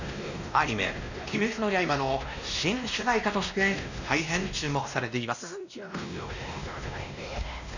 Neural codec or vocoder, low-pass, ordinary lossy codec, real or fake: codec, 16 kHz, 1 kbps, X-Codec, WavLM features, trained on Multilingual LibriSpeech; 7.2 kHz; none; fake